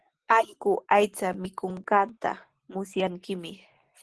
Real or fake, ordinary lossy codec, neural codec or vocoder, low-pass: real; Opus, 16 kbps; none; 10.8 kHz